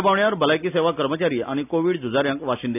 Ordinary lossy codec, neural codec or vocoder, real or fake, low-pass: none; none; real; 3.6 kHz